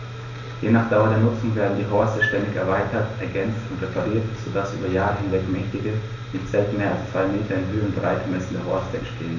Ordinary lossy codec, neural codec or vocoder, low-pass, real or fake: none; none; 7.2 kHz; real